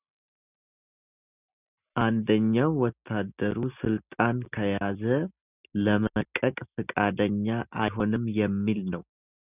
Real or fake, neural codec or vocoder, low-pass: real; none; 3.6 kHz